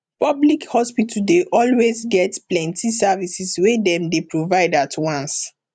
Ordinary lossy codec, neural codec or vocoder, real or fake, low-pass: none; vocoder, 44.1 kHz, 128 mel bands every 512 samples, BigVGAN v2; fake; 9.9 kHz